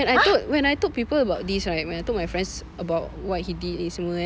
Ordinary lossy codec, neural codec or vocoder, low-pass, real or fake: none; none; none; real